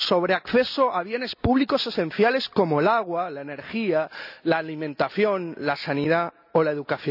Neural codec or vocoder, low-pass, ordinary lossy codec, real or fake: none; 5.4 kHz; none; real